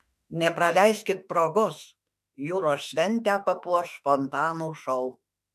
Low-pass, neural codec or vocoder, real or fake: 14.4 kHz; autoencoder, 48 kHz, 32 numbers a frame, DAC-VAE, trained on Japanese speech; fake